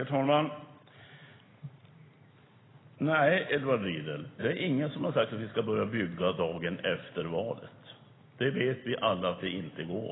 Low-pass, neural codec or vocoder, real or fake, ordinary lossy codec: 7.2 kHz; none; real; AAC, 16 kbps